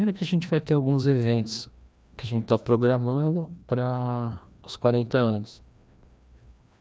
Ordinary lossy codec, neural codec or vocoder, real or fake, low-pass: none; codec, 16 kHz, 1 kbps, FreqCodec, larger model; fake; none